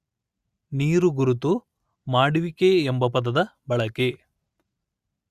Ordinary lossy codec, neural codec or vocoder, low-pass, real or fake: Opus, 64 kbps; none; 14.4 kHz; real